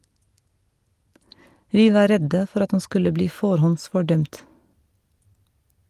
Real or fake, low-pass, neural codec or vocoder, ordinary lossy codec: real; 14.4 kHz; none; Opus, 24 kbps